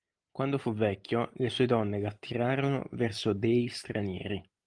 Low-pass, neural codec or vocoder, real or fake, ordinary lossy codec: 9.9 kHz; none; real; Opus, 24 kbps